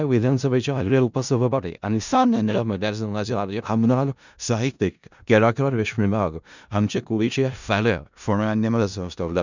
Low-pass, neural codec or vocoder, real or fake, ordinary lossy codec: 7.2 kHz; codec, 16 kHz in and 24 kHz out, 0.4 kbps, LongCat-Audio-Codec, four codebook decoder; fake; none